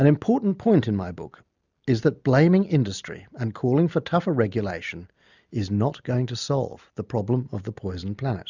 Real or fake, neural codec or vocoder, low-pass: real; none; 7.2 kHz